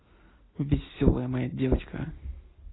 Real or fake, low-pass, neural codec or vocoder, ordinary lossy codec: real; 7.2 kHz; none; AAC, 16 kbps